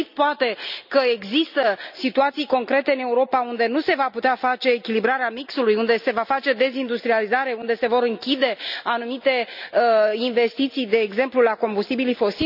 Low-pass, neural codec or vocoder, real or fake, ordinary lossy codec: 5.4 kHz; none; real; none